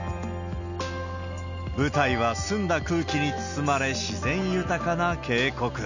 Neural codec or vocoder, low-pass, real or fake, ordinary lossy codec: none; 7.2 kHz; real; none